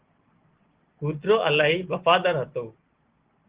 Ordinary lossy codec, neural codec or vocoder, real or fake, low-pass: Opus, 16 kbps; none; real; 3.6 kHz